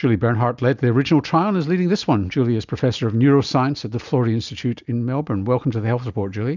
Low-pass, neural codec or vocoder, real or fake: 7.2 kHz; none; real